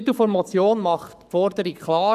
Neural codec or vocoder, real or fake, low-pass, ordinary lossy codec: codec, 44.1 kHz, 7.8 kbps, Pupu-Codec; fake; 14.4 kHz; none